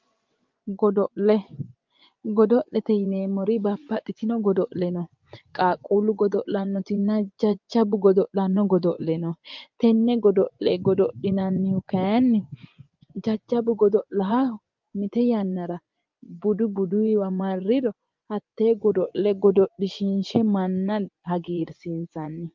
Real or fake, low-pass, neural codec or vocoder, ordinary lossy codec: fake; 7.2 kHz; vocoder, 24 kHz, 100 mel bands, Vocos; Opus, 24 kbps